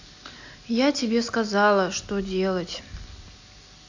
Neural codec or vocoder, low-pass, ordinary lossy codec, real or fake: none; 7.2 kHz; AAC, 48 kbps; real